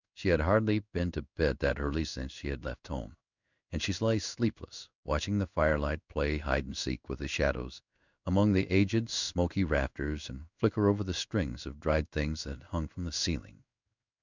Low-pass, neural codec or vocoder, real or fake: 7.2 kHz; codec, 16 kHz in and 24 kHz out, 1 kbps, XY-Tokenizer; fake